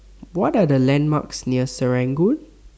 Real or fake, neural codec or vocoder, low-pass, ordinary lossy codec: real; none; none; none